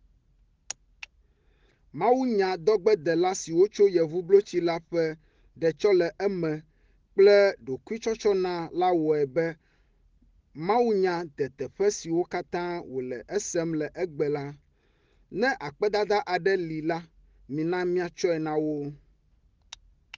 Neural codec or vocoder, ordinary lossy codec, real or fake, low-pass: none; Opus, 16 kbps; real; 7.2 kHz